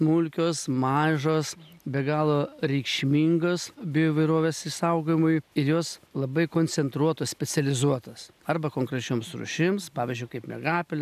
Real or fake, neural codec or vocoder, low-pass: real; none; 14.4 kHz